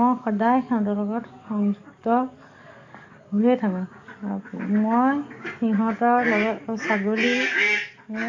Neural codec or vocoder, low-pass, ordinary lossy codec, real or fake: codec, 44.1 kHz, 7.8 kbps, DAC; 7.2 kHz; AAC, 48 kbps; fake